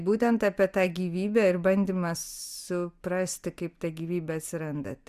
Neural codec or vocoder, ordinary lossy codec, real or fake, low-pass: none; Opus, 64 kbps; real; 14.4 kHz